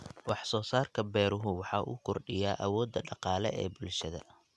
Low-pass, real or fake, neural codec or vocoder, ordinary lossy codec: none; real; none; none